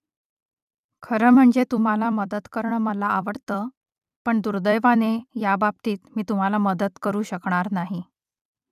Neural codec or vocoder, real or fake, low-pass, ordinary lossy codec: vocoder, 44.1 kHz, 128 mel bands every 256 samples, BigVGAN v2; fake; 14.4 kHz; none